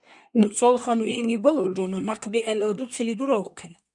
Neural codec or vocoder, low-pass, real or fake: codec, 24 kHz, 1 kbps, SNAC; 10.8 kHz; fake